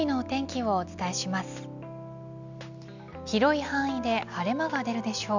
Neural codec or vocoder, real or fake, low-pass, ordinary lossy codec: none; real; 7.2 kHz; none